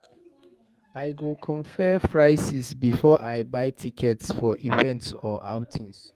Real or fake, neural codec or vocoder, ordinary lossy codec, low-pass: fake; codec, 32 kHz, 1.9 kbps, SNAC; Opus, 32 kbps; 14.4 kHz